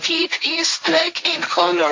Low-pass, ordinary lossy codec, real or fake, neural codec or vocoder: 7.2 kHz; MP3, 48 kbps; fake; codec, 16 kHz, 1.1 kbps, Voila-Tokenizer